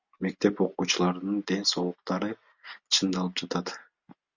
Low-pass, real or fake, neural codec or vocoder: 7.2 kHz; real; none